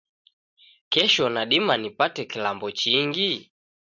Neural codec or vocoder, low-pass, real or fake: none; 7.2 kHz; real